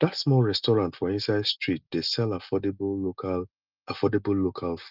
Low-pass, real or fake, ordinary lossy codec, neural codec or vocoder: 5.4 kHz; real; Opus, 24 kbps; none